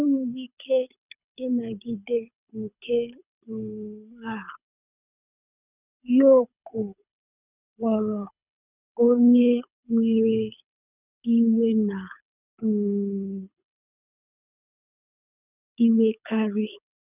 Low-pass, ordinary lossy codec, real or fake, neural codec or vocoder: 3.6 kHz; none; fake; codec, 24 kHz, 6 kbps, HILCodec